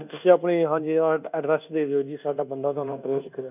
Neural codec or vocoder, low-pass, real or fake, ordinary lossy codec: codec, 24 kHz, 1.2 kbps, DualCodec; 3.6 kHz; fake; none